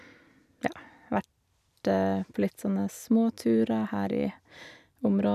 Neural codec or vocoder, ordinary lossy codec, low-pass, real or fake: none; none; 14.4 kHz; real